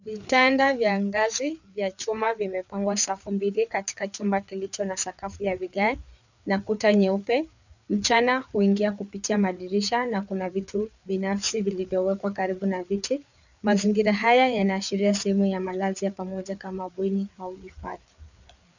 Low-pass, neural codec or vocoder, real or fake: 7.2 kHz; codec, 16 kHz, 4 kbps, FreqCodec, larger model; fake